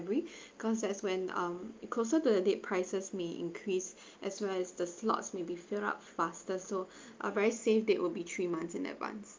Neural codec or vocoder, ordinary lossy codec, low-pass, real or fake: none; Opus, 24 kbps; 7.2 kHz; real